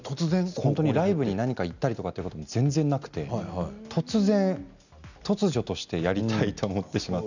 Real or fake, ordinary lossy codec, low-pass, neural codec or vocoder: real; none; 7.2 kHz; none